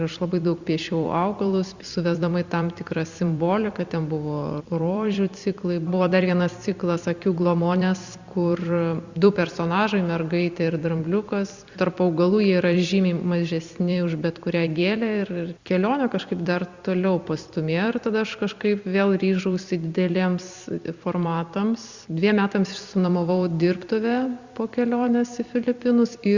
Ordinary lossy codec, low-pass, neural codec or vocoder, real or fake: Opus, 64 kbps; 7.2 kHz; vocoder, 44.1 kHz, 128 mel bands every 256 samples, BigVGAN v2; fake